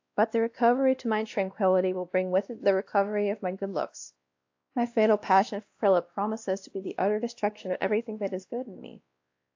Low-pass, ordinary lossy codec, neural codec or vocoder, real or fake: 7.2 kHz; AAC, 48 kbps; codec, 16 kHz, 1 kbps, X-Codec, WavLM features, trained on Multilingual LibriSpeech; fake